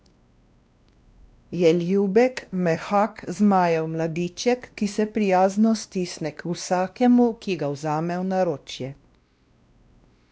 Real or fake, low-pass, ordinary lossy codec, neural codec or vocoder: fake; none; none; codec, 16 kHz, 1 kbps, X-Codec, WavLM features, trained on Multilingual LibriSpeech